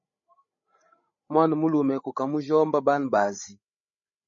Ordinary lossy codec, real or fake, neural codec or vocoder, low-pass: MP3, 32 kbps; fake; codec, 16 kHz, 16 kbps, FreqCodec, larger model; 7.2 kHz